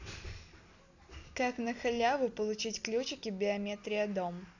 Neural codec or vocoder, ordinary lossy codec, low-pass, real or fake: none; none; 7.2 kHz; real